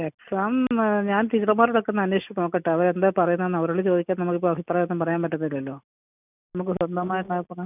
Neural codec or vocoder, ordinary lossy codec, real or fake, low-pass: none; none; real; 3.6 kHz